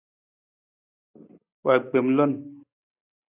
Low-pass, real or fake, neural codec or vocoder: 3.6 kHz; real; none